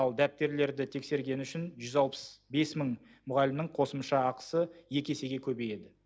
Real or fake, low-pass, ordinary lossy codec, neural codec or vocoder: real; none; none; none